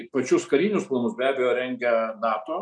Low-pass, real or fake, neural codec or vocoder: 9.9 kHz; real; none